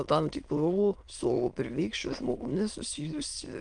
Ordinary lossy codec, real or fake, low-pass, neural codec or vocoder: Opus, 32 kbps; fake; 9.9 kHz; autoencoder, 22.05 kHz, a latent of 192 numbers a frame, VITS, trained on many speakers